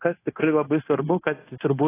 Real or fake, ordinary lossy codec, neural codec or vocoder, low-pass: fake; AAC, 16 kbps; codec, 16 kHz, 0.9 kbps, LongCat-Audio-Codec; 3.6 kHz